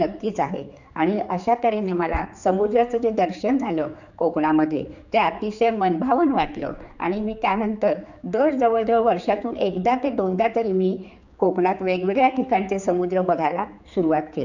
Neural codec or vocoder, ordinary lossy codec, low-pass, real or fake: codec, 16 kHz, 4 kbps, X-Codec, HuBERT features, trained on general audio; none; 7.2 kHz; fake